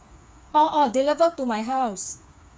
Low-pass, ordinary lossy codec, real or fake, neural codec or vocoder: none; none; fake; codec, 16 kHz, 4 kbps, FreqCodec, smaller model